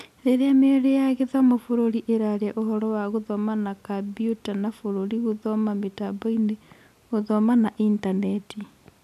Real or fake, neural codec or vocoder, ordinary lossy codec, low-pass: real; none; none; 14.4 kHz